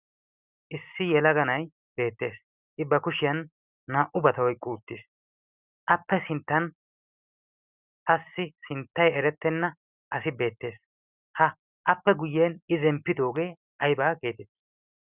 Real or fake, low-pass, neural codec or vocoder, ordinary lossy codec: real; 3.6 kHz; none; Opus, 64 kbps